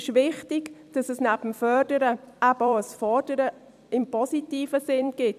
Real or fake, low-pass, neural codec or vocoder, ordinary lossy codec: fake; 14.4 kHz; vocoder, 44.1 kHz, 128 mel bands every 256 samples, BigVGAN v2; none